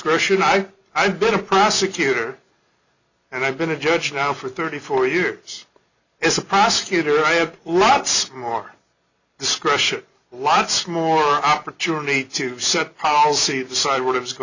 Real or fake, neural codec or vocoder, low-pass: real; none; 7.2 kHz